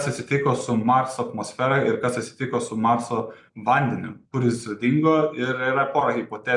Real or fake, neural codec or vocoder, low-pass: real; none; 10.8 kHz